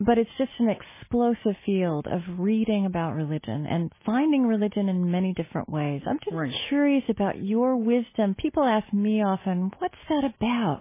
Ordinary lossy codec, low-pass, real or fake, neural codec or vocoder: MP3, 16 kbps; 3.6 kHz; real; none